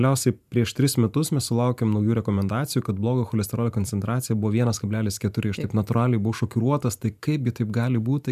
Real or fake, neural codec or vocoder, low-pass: fake; vocoder, 44.1 kHz, 128 mel bands every 512 samples, BigVGAN v2; 14.4 kHz